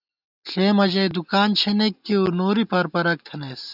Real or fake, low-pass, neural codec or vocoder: real; 5.4 kHz; none